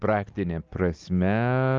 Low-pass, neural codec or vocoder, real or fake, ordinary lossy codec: 7.2 kHz; none; real; Opus, 24 kbps